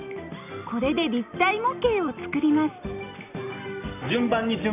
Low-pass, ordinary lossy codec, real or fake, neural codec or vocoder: 3.6 kHz; none; real; none